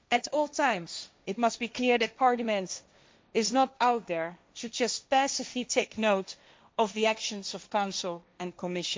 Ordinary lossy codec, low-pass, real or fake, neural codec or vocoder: none; none; fake; codec, 16 kHz, 1.1 kbps, Voila-Tokenizer